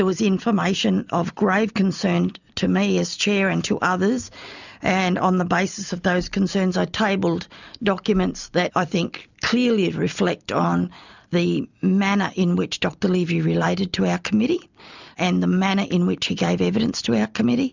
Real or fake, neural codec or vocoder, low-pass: real; none; 7.2 kHz